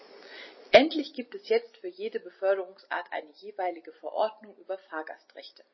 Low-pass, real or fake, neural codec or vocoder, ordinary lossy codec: 7.2 kHz; real; none; MP3, 24 kbps